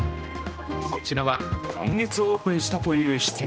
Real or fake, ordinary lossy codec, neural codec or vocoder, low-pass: fake; none; codec, 16 kHz, 1 kbps, X-Codec, HuBERT features, trained on general audio; none